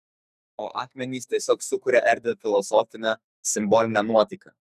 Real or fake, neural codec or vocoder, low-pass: fake; codec, 44.1 kHz, 2.6 kbps, SNAC; 14.4 kHz